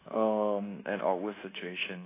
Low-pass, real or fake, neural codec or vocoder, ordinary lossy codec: 3.6 kHz; fake; codec, 24 kHz, 1.2 kbps, DualCodec; AAC, 24 kbps